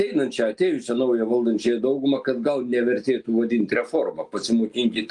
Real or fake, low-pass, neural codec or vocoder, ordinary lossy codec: real; 10.8 kHz; none; Opus, 32 kbps